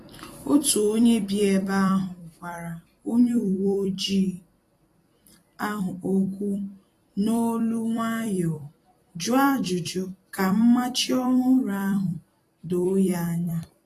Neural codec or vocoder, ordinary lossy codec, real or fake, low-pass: vocoder, 44.1 kHz, 128 mel bands every 256 samples, BigVGAN v2; AAC, 48 kbps; fake; 14.4 kHz